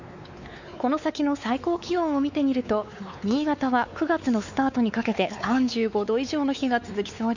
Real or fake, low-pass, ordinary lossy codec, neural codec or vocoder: fake; 7.2 kHz; none; codec, 16 kHz, 4 kbps, X-Codec, WavLM features, trained on Multilingual LibriSpeech